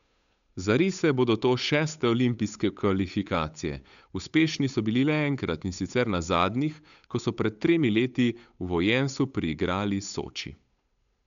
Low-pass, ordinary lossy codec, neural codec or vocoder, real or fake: 7.2 kHz; MP3, 96 kbps; codec, 16 kHz, 8 kbps, FunCodec, trained on Chinese and English, 25 frames a second; fake